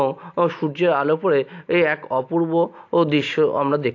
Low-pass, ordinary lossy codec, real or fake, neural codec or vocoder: 7.2 kHz; AAC, 48 kbps; real; none